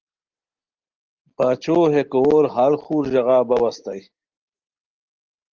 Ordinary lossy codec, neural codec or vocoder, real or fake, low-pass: Opus, 16 kbps; none; real; 7.2 kHz